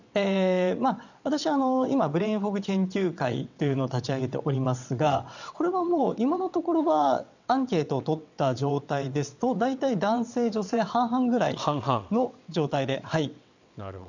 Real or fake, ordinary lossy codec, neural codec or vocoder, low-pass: fake; none; vocoder, 22.05 kHz, 80 mel bands, WaveNeXt; 7.2 kHz